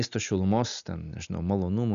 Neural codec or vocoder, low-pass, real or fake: none; 7.2 kHz; real